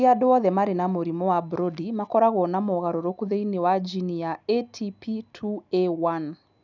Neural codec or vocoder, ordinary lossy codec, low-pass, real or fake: none; none; 7.2 kHz; real